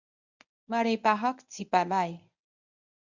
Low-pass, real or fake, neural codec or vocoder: 7.2 kHz; fake; codec, 24 kHz, 0.9 kbps, WavTokenizer, medium speech release version 1